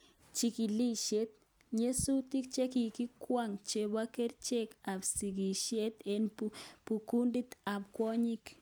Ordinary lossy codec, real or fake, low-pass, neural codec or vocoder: none; real; none; none